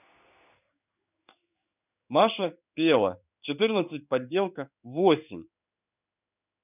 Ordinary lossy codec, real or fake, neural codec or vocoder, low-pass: none; fake; codec, 16 kHz in and 24 kHz out, 1 kbps, XY-Tokenizer; 3.6 kHz